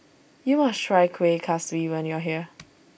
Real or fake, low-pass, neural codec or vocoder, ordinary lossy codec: real; none; none; none